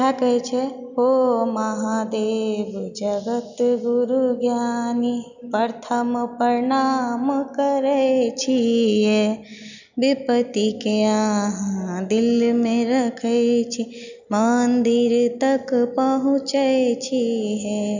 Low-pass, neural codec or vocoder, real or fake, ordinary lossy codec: 7.2 kHz; none; real; none